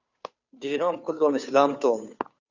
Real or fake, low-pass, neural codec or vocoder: fake; 7.2 kHz; codec, 16 kHz, 2 kbps, FunCodec, trained on Chinese and English, 25 frames a second